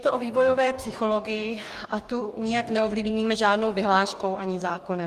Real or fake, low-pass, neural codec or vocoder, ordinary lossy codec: fake; 14.4 kHz; codec, 44.1 kHz, 2.6 kbps, DAC; Opus, 32 kbps